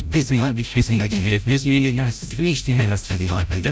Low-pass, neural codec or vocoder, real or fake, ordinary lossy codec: none; codec, 16 kHz, 0.5 kbps, FreqCodec, larger model; fake; none